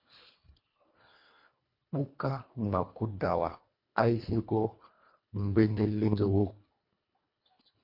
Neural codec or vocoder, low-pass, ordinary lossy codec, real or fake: codec, 24 kHz, 1.5 kbps, HILCodec; 5.4 kHz; MP3, 32 kbps; fake